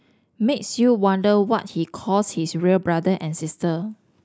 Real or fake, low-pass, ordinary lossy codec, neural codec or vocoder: real; none; none; none